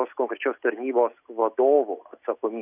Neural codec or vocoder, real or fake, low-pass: none; real; 3.6 kHz